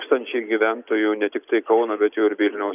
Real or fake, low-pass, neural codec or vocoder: real; 3.6 kHz; none